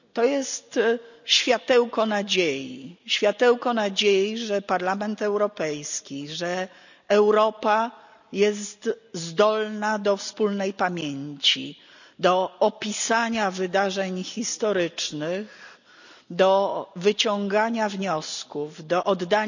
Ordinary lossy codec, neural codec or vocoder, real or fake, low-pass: none; none; real; 7.2 kHz